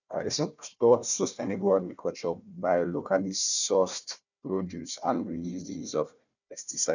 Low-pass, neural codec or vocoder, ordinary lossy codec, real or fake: 7.2 kHz; codec, 16 kHz, 1 kbps, FunCodec, trained on Chinese and English, 50 frames a second; none; fake